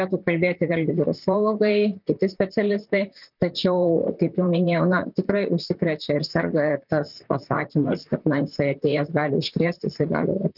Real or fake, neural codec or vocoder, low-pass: fake; vocoder, 44.1 kHz, 128 mel bands, Pupu-Vocoder; 5.4 kHz